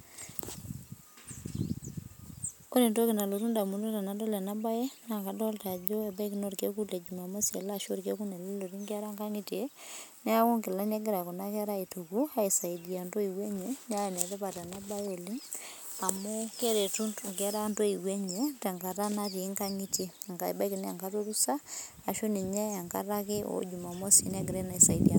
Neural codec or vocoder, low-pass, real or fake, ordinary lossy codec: none; none; real; none